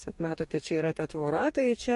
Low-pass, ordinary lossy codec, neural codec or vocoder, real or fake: 14.4 kHz; MP3, 48 kbps; codec, 44.1 kHz, 2.6 kbps, DAC; fake